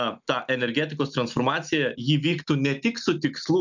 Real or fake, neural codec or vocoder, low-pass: real; none; 7.2 kHz